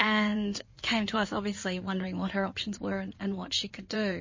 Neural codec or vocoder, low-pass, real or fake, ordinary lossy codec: codec, 16 kHz in and 24 kHz out, 2.2 kbps, FireRedTTS-2 codec; 7.2 kHz; fake; MP3, 32 kbps